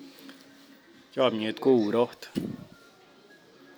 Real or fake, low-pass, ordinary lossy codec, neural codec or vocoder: real; none; none; none